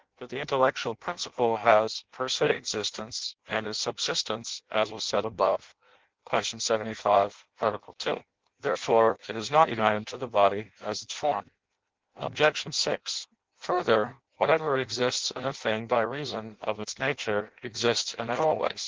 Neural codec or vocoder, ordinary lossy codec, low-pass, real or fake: codec, 16 kHz in and 24 kHz out, 0.6 kbps, FireRedTTS-2 codec; Opus, 16 kbps; 7.2 kHz; fake